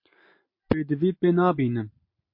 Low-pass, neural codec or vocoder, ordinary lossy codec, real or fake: 5.4 kHz; none; MP3, 32 kbps; real